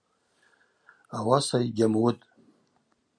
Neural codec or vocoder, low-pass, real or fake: none; 9.9 kHz; real